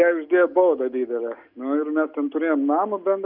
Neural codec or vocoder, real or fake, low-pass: none; real; 5.4 kHz